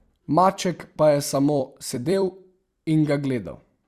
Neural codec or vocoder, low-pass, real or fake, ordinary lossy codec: vocoder, 44.1 kHz, 128 mel bands every 256 samples, BigVGAN v2; 14.4 kHz; fake; Opus, 64 kbps